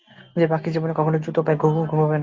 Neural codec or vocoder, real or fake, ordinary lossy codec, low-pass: none; real; Opus, 32 kbps; 7.2 kHz